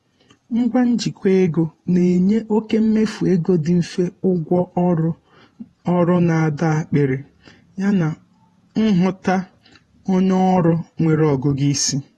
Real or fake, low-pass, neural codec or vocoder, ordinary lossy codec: fake; 19.8 kHz; vocoder, 48 kHz, 128 mel bands, Vocos; AAC, 32 kbps